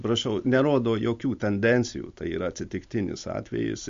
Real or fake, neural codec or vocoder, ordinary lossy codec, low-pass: real; none; MP3, 48 kbps; 7.2 kHz